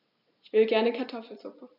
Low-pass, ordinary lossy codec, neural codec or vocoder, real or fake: 5.4 kHz; none; none; real